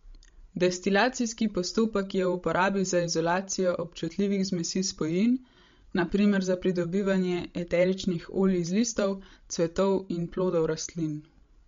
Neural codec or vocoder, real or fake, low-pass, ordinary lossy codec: codec, 16 kHz, 16 kbps, FreqCodec, larger model; fake; 7.2 kHz; MP3, 48 kbps